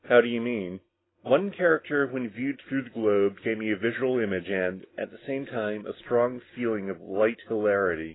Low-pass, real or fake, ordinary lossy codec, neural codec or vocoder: 7.2 kHz; real; AAC, 16 kbps; none